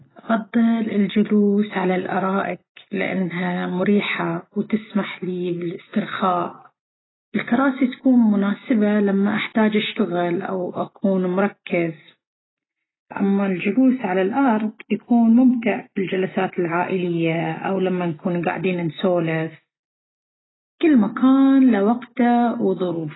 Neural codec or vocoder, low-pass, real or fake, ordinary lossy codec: none; 7.2 kHz; real; AAC, 16 kbps